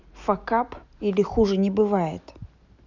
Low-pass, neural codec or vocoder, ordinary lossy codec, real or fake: 7.2 kHz; none; none; real